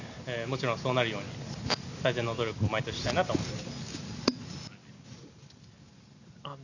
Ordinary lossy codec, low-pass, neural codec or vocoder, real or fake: none; 7.2 kHz; none; real